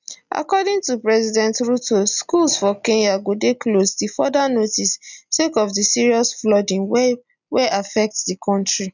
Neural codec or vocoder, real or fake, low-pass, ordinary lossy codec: none; real; 7.2 kHz; none